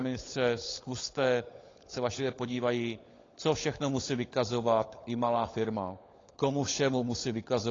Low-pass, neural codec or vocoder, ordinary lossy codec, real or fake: 7.2 kHz; codec, 16 kHz, 16 kbps, FunCodec, trained on LibriTTS, 50 frames a second; AAC, 32 kbps; fake